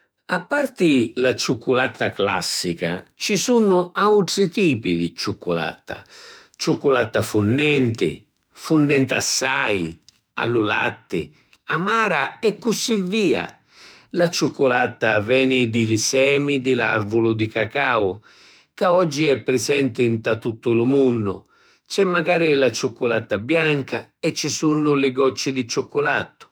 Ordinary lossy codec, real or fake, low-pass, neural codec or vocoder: none; fake; none; autoencoder, 48 kHz, 32 numbers a frame, DAC-VAE, trained on Japanese speech